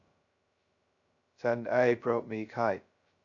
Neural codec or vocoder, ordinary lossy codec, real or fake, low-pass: codec, 16 kHz, 0.2 kbps, FocalCodec; none; fake; 7.2 kHz